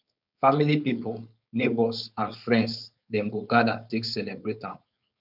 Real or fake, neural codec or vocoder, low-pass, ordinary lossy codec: fake; codec, 16 kHz, 4.8 kbps, FACodec; 5.4 kHz; none